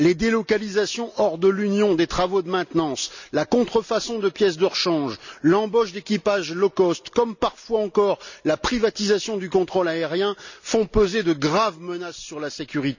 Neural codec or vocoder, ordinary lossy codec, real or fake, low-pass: none; none; real; 7.2 kHz